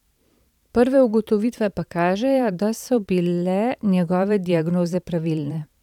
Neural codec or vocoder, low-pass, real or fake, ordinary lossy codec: vocoder, 44.1 kHz, 128 mel bands every 512 samples, BigVGAN v2; 19.8 kHz; fake; none